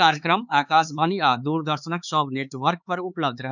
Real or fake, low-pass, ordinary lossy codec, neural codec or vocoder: fake; 7.2 kHz; none; codec, 16 kHz, 4 kbps, X-Codec, HuBERT features, trained on LibriSpeech